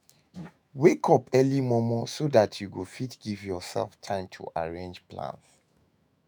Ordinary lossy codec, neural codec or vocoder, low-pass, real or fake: none; autoencoder, 48 kHz, 128 numbers a frame, DAC-VAE, trained on Japanese speech; none; fake